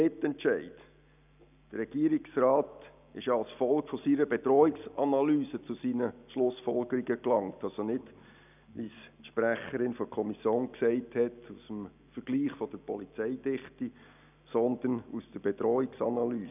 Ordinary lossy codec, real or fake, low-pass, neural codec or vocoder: none; real; 3.6 kHz; none